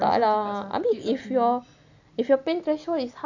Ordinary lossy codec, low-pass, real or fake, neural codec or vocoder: none; 7.2 kHz; real; none